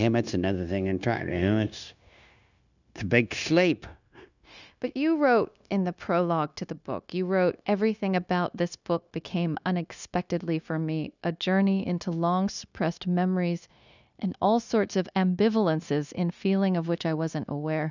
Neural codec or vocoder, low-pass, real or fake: codec, 16 kHz, 0.9 kbps, LongCat-Audio-Codec; 7.2 kHz; fake